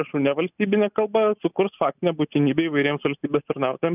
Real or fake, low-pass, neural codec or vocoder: real; 3.6 kHz; none